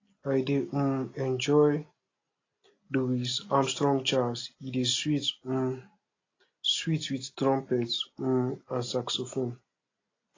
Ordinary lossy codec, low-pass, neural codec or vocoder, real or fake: AAC, 32 kbps; 7.2 kHz; none; real